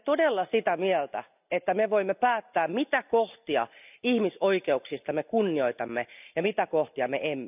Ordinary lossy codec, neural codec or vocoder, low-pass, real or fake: none; none; 3.6 kHz; real